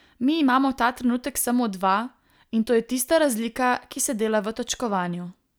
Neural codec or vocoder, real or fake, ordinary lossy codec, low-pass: none; real; none; none